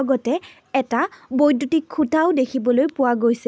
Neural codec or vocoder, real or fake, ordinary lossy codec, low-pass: none; real; none; none